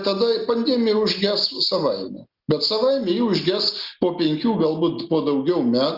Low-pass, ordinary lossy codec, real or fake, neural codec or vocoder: 5.4 kHz; Opus, 64 kbps; real; none